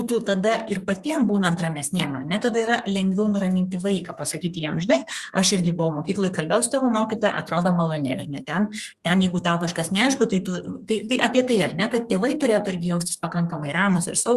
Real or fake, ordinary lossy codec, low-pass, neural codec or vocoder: fake; Opus, 64 kbps; 14.4 kHz; codec, 32 kHz, 1.9 kbps, SNAC